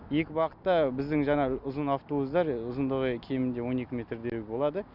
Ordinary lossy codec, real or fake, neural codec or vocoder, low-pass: none; real; none; 5.4 kHz